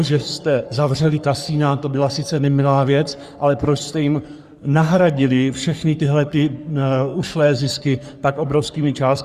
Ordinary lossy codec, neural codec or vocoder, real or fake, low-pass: Opus, 64 kbps; codec, 44.1 kHz, 3.4 kbps, Pupu-Codec; fake; 14.4 kHz